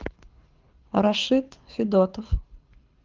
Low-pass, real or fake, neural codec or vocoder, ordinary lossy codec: 7.2 kHz; fake; codec, 24 kHz, 6 kbps, HILCodec; Opus, 32 kbps